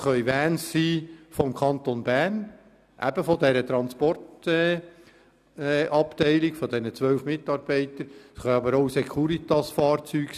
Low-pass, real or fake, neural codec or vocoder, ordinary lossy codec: 14.4 kHz; real; none; none